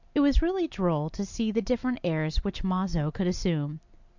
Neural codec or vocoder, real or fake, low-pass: none; real; 7.2 kHz